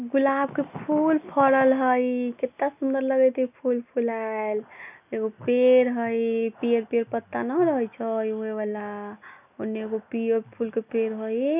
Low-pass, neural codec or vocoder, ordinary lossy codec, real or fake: 3.6 kHz; none; none; real